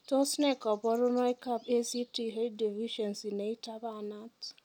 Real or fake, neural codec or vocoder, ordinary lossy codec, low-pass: real; none; none; 19.8 kHz